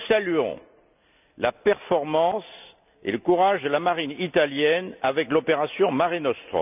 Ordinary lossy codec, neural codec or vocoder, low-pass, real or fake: none; none; 3.6 kHz; real